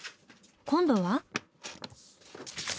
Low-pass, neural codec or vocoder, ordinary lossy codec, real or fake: none; none; none; real